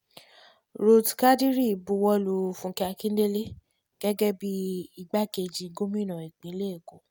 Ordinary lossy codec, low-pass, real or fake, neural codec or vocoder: none; none; real; none